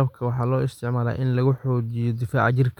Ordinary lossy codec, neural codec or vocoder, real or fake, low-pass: none; none; real; 19.8 kHz